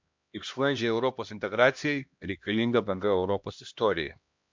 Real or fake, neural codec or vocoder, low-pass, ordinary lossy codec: fake; codec, 16 kHz, 1 kbps, X-Codec, HuBERT features, trained on LibriSpeech; 7.2 kHz; MP3, 64 kbps